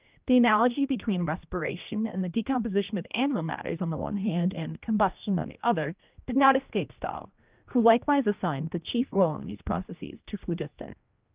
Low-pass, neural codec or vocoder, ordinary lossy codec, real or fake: 3.6 kHz; codec, 16 kHz, 1 kbps, X-Codec, HuBERT features, trained on general audio; Opus, 24 kbps; fake